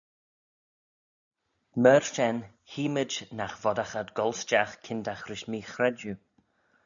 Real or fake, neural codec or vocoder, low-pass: real; none; 7.2 kHz